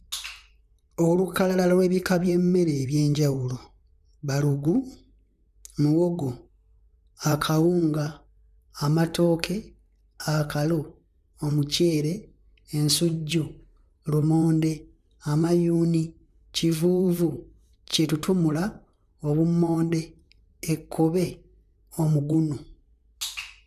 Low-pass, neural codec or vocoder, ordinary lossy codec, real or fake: 14.4 kHz; vocoder, 44.1 kHz, 128 mel bands, Pupu-Vocoder; none; fake